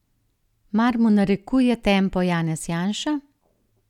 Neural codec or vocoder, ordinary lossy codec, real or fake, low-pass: none; none; real; 19.8 kHz